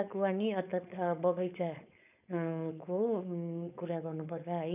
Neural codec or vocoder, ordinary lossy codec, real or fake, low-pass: codec, 16 kHz, 4.8 kbps, FACodec; none; fake; 3.6 kHz